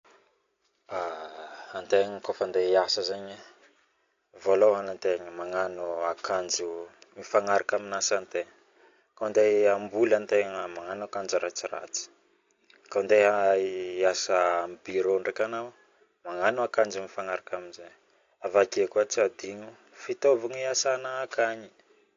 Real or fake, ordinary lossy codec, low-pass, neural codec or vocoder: real; AAC, 48 kbps; 7.2 kHz; none